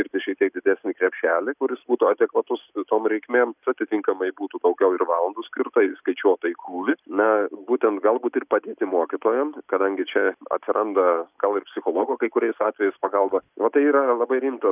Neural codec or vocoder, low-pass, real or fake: none; 3.6 kHz; real